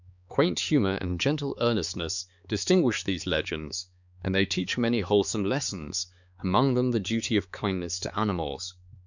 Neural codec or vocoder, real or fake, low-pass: codec, 16 kHz, 4 kbps, X-Codec, HuBERT features, trained on balanced general audio; fake; 7.2 kHz